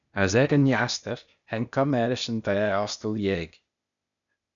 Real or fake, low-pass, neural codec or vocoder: fake; 7.2 kHz; codec, 16 kHz, 0.8 kbps, ZipCodec